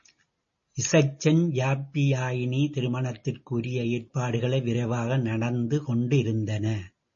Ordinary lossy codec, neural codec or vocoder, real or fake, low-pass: MP3, 32 kbps; none; real; 7.2 kHz